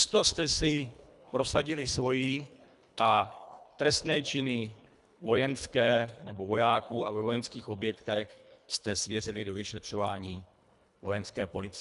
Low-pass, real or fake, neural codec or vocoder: 10.8 kHz; fake; codec, 24 kHz, 1.5 kbps, HILCodec